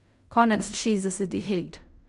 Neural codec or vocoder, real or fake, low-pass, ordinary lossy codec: codec, 16 kHz in and 24 kHz out, 0.4 kbps, LongCat-Audio-Codec, fine tuned four codebook decoder; fake; 10.8 kHz; none